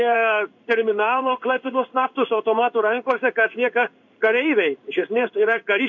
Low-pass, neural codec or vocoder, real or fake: 7.2 kHz; codec, 16 kHz in and 24 kHz out, 1 kbps, XY-Tokenizer; fake